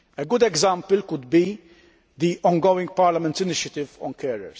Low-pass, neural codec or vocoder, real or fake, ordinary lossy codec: none; none; real; none